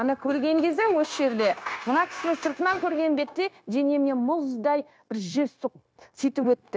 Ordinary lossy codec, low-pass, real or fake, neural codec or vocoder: none; none; fake; codec, 16 kHz, 0.9 kbps, LongCat-Audio-Codec